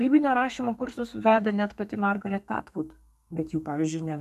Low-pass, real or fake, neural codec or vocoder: 14.4 kHz; fake; codec, 32 kHz, 1.9 kbps, SNAC